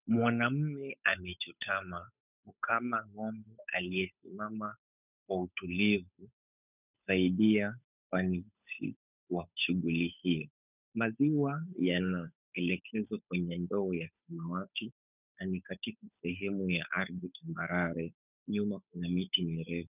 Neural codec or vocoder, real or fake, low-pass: codec, 16 kHz, 8 kbps, FunCodec, trained on Chinese and English, 25 frames a second; fake; 3.6 kHz